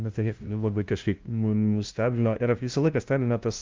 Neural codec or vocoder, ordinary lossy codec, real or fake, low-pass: codec, 16 kHz, 0.5 kbps, FunCodec, trained on LibriTTS, 25 frames a second; Opus, 24 kbps; fake; 7.2 kHz